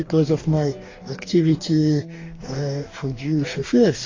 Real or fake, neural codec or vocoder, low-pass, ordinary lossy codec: fake; codec, 44.1 kHz, 2.6 kbps, DAC; 7.2 kHz; AAC, 32 kbps